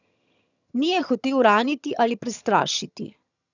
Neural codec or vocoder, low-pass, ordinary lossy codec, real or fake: vocoder, 22.05 kHz, 80 mel bands, HiFi-GAN; 7.2 kHz; none; fake